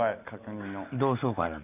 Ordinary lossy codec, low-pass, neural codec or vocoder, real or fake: none; 3.6 kHz; none; real